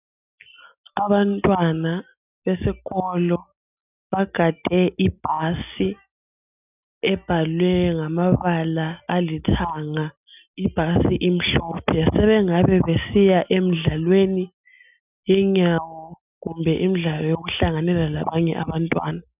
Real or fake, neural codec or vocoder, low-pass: real; none; 3.6 kHz